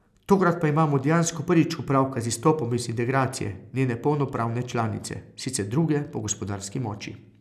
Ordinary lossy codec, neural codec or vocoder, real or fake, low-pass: none; none; real; 14.4 kHz